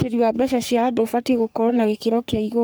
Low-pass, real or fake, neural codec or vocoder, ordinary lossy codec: none; fake; codec, 44.1 kHz, 3.4 kbps, Pupu-Codec; none